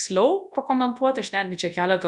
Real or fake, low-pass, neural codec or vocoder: fake; 10.8 kHz; codec, 24 kHz, 0.9 kbps, WavTokenizer, large speech release